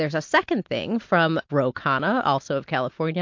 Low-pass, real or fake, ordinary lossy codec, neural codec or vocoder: 7.2 kHz; real; MP3, 48 kbps; none